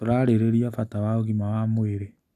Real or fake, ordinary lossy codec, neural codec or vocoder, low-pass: real; none; none; 14.4 kHz